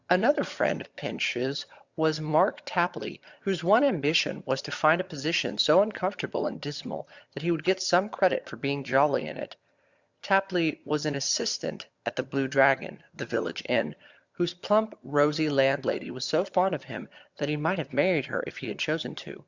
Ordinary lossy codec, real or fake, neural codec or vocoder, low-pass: Opus, 64 kbps; fake; vocoder, 22.05 kHz, 80 mel bands, HiFi-GAN; 7.2 kHz